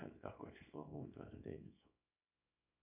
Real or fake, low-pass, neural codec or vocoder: fake; 3.6 kHz; codec, 24 kHz, 0.9 kbps, WavTokenizer, small release